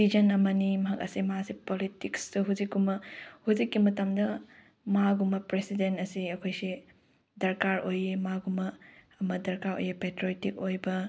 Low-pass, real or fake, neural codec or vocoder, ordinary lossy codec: none; real; none; none